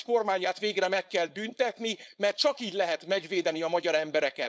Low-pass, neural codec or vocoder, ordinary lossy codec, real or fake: none; codec, 16 kHz, 4.8 kbps, FACodec; none; fake